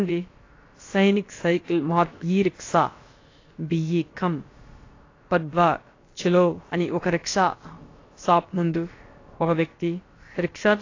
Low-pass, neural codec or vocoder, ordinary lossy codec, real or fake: 7.2 kHz; codec, 16 kHz, about 1 kbps, DyCAST, with the encoder's durations; AAC, 32 kbps; fake